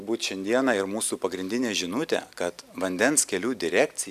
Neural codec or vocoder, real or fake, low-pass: none; real; 14.4 kHz